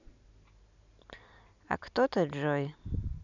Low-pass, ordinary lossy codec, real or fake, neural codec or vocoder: 7.2 kHz; none; real; none